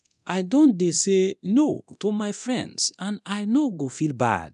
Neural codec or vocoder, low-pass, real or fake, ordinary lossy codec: codec, 24 kHz, 0.9 kbps, DualCodec; 10.8 kHz; fake; none